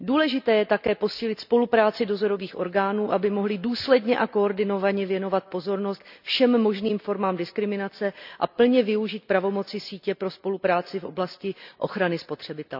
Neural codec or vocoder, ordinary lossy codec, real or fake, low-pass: none; none; real; 5.4 kHz